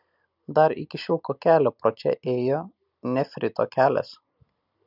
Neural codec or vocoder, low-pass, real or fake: none; 5.4 kHz; real